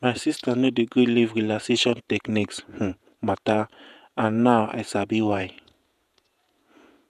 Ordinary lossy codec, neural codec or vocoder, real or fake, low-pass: none; vocoder, 48 kHz, 128 mel bands, Vocos; fake; 14.4 kHz